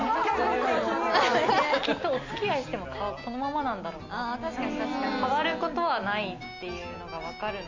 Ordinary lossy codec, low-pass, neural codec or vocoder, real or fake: MP3, 48 kbps; 7.2 kHz; none; real